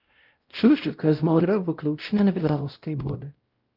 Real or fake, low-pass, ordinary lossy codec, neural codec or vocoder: fake; 5.4 kHz; Opus, 24 kbps; codec, 16 kHz, 0.5 kbps, X-Codec, WavLM features, trained on Multilingual LibriSpeech